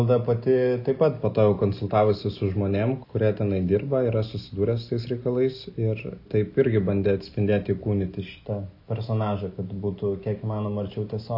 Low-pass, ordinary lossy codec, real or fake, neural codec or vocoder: 5.4 kHz; AAC, 48 kbps; real; none